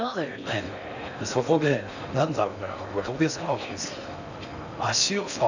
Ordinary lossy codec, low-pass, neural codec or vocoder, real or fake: none; 7.2 kHz; codec, 16 kHz in and 24 kHz out, 0.6 kbps, FocalCodec, streaming, 4096 codes; fake